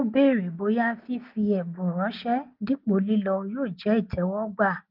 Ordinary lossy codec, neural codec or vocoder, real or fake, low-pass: Opus, 24 kbps; none; real; 5.4 kHz